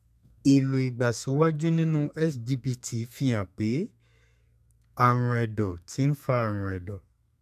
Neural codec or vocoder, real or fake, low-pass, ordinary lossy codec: codec, 32 kHz, 1.9 kbps, SNAC; fake; 14.4 kHz; MP3, 96 kbps